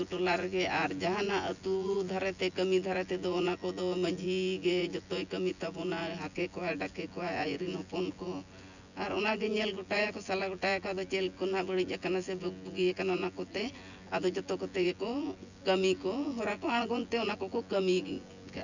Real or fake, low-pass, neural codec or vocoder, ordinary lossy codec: fake; 7.2 kHz; vocoder, 24 kHz, 100 mel bands, Vocos; none